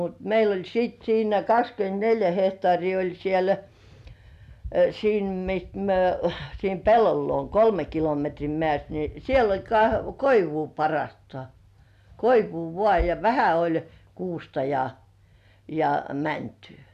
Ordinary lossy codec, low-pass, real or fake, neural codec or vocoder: none; 14.4 kHz; real; none